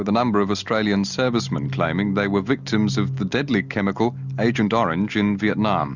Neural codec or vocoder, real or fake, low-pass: none; real; 7.2 kHz